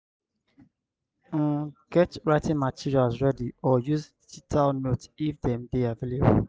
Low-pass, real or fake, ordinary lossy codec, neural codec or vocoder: none; real; none; none